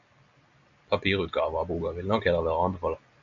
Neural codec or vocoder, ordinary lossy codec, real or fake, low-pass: none; AAC, 64 kbps; real; 7.2 kHz